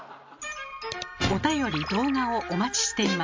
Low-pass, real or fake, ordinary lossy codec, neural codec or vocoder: 7.2 kHz; real; MP3, 64 kbps; none